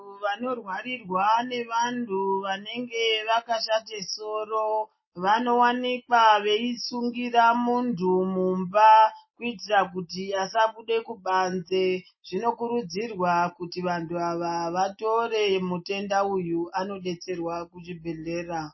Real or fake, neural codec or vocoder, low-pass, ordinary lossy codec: real; none; 7.2 kHz; MP3, 24 kbps